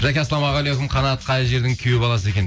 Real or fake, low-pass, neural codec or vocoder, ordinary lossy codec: real; none; none; none